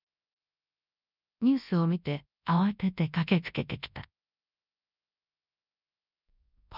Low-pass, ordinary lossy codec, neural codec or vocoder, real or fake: 5.4 kHz; none; codec, 24 kHz, 0.9 kbps, WavTokenizer, large speech release; fake